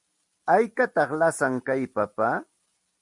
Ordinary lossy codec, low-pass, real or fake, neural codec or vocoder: MP3, 96 kbps; 10.8 kHz; real; none